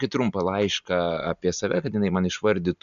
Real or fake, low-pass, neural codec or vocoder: real; 7.2 kHz; none